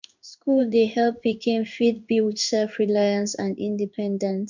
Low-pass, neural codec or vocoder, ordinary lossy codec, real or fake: 7.2 kHz; codec, 16 kHz in and 24 kHz out, 1 kbps, XY-Tokenizer; none; fake